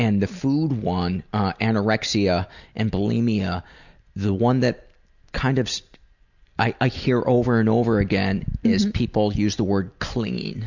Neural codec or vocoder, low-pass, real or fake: none; 7.2 kHz; real